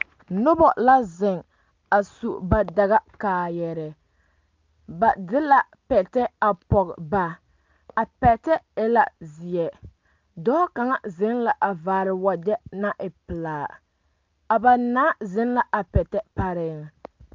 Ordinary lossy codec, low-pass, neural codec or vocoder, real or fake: Opus, 24 kbps; 7.2 kHz; none; real